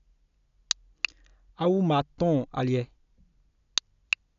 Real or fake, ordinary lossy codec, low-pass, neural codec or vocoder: real; none; 7.2 kHz; none